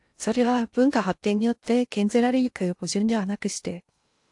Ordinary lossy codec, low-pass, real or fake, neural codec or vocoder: AAC, 64 kbps; 10.8 kHz; fake; codec, 16 kHz in and 24 kHz out, 0.6 kbps, FocalCodec, streaming, 2048 codes